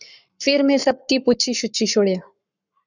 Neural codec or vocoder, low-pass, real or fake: codec, 44.1 kHz, 7.8 kbps, Pupu-Codec; 7.2 kHz; fake